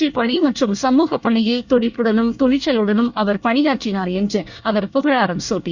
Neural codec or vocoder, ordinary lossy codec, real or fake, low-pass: codec, 24 kHz, 1 kbps, SNAC; Opus, 64 kbps; fake; 7.2 kHz